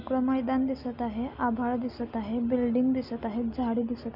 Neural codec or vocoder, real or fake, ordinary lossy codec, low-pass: none; real; none; 5.4 kHz